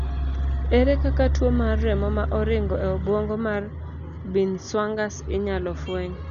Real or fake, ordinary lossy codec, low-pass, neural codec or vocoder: real; none; 7.2 kHz; none